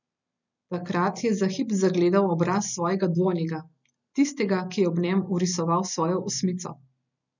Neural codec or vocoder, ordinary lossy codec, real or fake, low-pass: none; none; real; 7.2 kHz